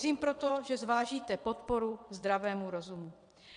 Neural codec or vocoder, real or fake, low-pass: vocoder, 22.05 kHz, 80 mel bands, WaveNeXt; fake; 9.9 kHz